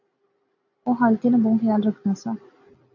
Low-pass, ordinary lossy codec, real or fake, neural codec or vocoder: 7.2 kHz; AAC, 48 kbps; real; none